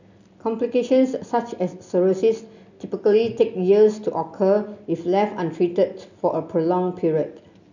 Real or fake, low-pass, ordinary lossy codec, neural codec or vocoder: real; 7.2 kHz; none; none